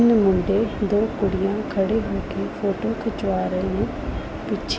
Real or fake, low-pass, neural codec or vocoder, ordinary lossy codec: real; none; none; none